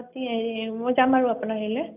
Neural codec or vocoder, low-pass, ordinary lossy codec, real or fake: none; 3.6 kHz; none; real